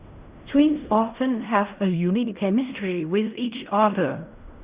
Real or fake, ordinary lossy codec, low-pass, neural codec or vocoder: fake; Opus, 64 kbps; 3.6 kHz; codec, 16 kHz in and 24 kHz out, 0.4 kbps, LongCat-Audio-Codec, fine tuned four codebook decoder